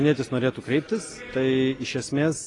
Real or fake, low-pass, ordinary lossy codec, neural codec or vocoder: real; 10.8 kHz; AAC, 32 kbps; none